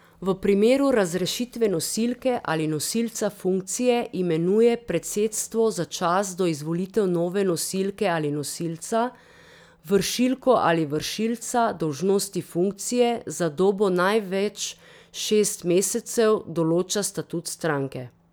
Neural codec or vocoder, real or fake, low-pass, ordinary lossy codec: none; real; none; none